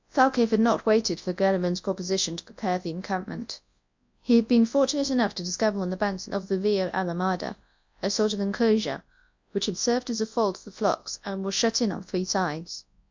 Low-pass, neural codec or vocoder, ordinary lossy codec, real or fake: 7.2 kHz; codec, 24 kHz, 0.9 kbps, WavTokenizer, large speech release; MP3, 64 kbps; fake